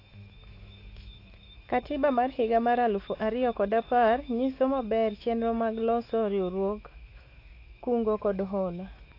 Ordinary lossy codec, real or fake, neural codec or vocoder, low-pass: AAC, 32 kbps; fake; autoencoder, 48 kHz, 128 numbers a frame, DAC-VAE, trained on Japanese speech; 5.4 kHz